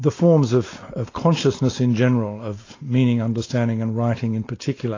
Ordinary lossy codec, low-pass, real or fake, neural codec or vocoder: AAC, 32 kbps; 7.2 kHz; real; none